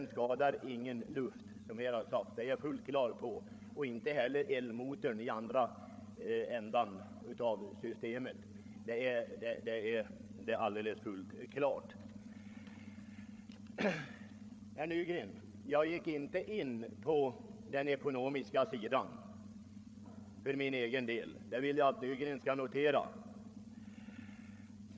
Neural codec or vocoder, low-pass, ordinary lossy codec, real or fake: codec, 16 kHz, 8 kbps, FreqCodec, larger model; none; none; fake